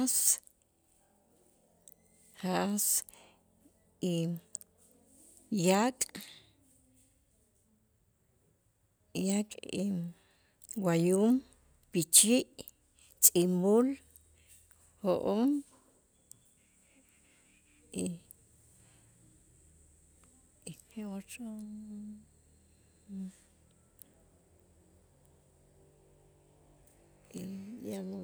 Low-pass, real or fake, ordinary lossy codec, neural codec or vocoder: none; real; none; none